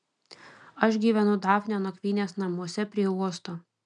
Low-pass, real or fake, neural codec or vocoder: 9.9 kHz; real; none